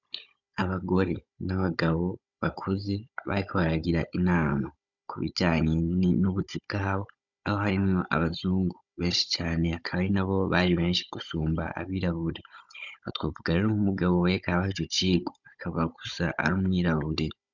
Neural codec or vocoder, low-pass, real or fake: codec, 16 kHz, 16 kbps, FunCodec, trained on Chinese and English, 50 frames a second; 7.2 kHz; fake